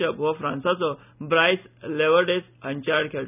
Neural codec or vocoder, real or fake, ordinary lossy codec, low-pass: none; real; none; 3.6 kHz